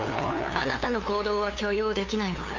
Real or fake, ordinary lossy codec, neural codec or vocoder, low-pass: fake; AAC, 48 kbps; codec, 16 kHz, 2 kbps, FunCodec, trained on LibriTTS, 25 frames a second; 7.2 kHz